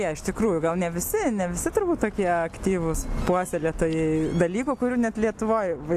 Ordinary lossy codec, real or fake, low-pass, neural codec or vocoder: AAC, 64 kbps; real; 14.4 kHz; none